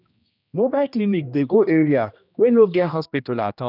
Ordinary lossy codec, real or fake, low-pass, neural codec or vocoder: none; fake; 5.4 kHz; codec, 16 kHz, 1 kbps, X-Codec, HuBERT features, trained on general audio